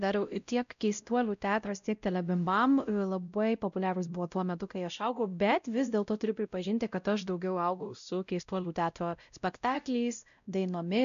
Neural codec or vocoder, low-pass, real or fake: codec, 16 kHz, 0.5 kbps, X-Codec, WavLM features, trained on Multilingual LibriSpeech; 7.2 kHz; fake